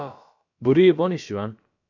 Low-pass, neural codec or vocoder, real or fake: 7.2 kHz; codec, 16 kHz, about 1 kbps, DyCAST, with the encoder's durations; fake